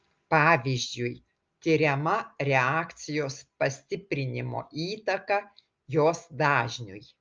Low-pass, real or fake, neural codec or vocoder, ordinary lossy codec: 7.2 kHz; real; none; Opus, 24 kbps